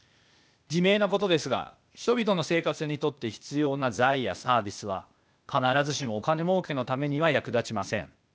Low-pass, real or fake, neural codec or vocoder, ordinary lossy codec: none; fake; codec, 16 kHz, 0.8 kbps, ZipCodec; none